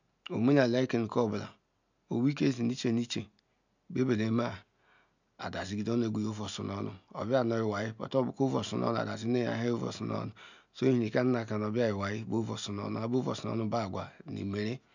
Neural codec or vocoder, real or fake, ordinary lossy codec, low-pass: none; real; none; 7.2 kHz